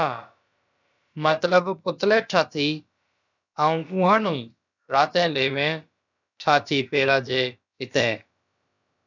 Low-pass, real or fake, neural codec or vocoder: 7.2 kHz; fake; codec, 16 kHz, about 1 kbps, DyCAST, with the encoder's durations